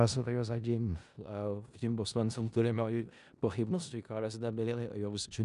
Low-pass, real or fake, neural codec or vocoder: 10.8 kHz; fake; codec, 16 kHz in and 24 kHz out, 0.4 kbps, LongCat-Audio-Codec, four codebook decoder